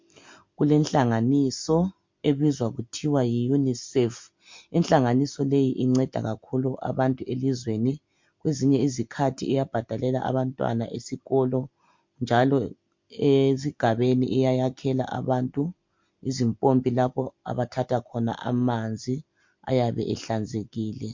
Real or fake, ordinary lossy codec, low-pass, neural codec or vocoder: real; MP3, 48 kbps; 7.2 kHz; none